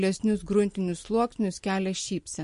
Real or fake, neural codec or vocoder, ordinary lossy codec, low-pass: real; none; MP3, 48 kbps; 10.8 kHz